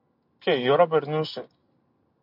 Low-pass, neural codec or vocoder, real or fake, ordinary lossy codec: 5.4 kHz; none; real; MP3, 48 kbps